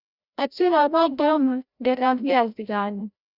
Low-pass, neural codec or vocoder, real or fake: 5.4 kHz; codec, 16 kHz, 0.5 kbps, FreqCodec, larger model; fake